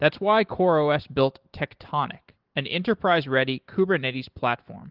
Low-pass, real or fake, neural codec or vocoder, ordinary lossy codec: 5.4 kHz; real; none; Opus, 16 kbps